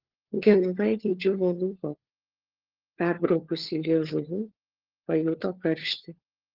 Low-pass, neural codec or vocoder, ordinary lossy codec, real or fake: 5.4 kHz; codec, 16 kHz, 4 kbps, FunCodec, trained on LibriTTS, 50 frames a second; Opus, 16 kbps; fake